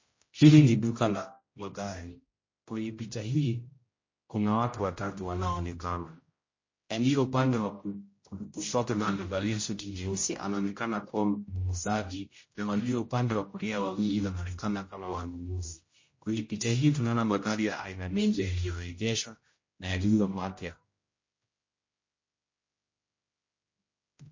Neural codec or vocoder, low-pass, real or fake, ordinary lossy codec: codec, 16 kHz, 0.5 kbps, X-Codec, HuBERT features, trained on general audio; 7.2 kHz; fake; MP3, 32 kbps